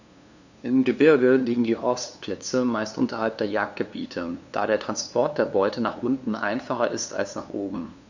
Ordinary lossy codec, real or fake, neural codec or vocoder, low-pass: none; fake; codec, 16 kHz, 2 kbps, FunCodec, trained on LibriTTS, 25 frames a second; 7.2 kHz